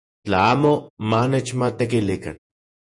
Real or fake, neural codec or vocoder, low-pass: fake; vocoder, 48 kHz, 128 mel bands, Vocos; 10.8 kHz